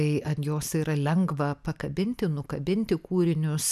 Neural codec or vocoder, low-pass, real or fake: none; 14.4 kHz; real